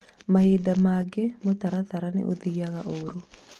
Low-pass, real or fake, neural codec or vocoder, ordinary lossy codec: 14.4 kHz; real; none; Opus, 24 kbps